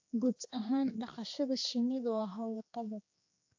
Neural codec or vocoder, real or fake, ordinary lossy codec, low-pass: codec, 16 kHz, 2 kbps, X-Codec, HuBERT features, trained on general audio; fake; AAC, 48 kbps; 7.2 kHz